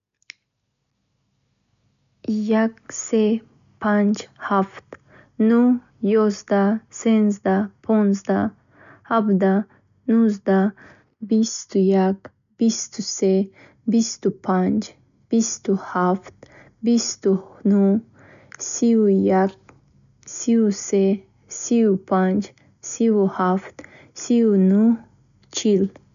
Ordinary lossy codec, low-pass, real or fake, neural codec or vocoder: none; 7.2 kHz; real; none